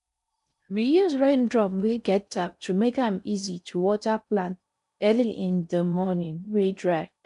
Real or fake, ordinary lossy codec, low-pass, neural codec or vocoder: fake; none; 10.8 kHz; codec, 16 kHz in and 24 kHz out, 0.6 kbps, FocalCodec, streaming, 4096 codes